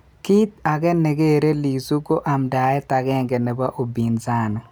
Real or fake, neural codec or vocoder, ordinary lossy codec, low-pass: real; none; none; none